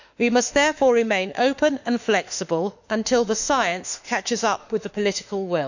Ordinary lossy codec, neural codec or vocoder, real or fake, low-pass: none; autoencoder, 48 kHz, 32 numbers a frame, DAC-VAE, trained on Japanese speech; fake; 7.2 kHz